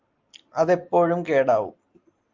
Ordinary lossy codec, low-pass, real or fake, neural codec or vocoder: Opus, 32 kbps; 7.2 kHz; real; none